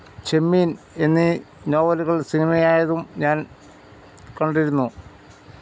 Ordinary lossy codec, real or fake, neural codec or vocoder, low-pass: none; real; none; none